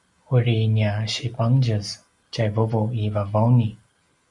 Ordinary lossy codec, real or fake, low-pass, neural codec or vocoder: Opus, 64 kbps; real; 10.8 kHz; none